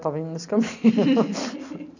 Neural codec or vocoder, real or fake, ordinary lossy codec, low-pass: none; real; none; 7.2 kHz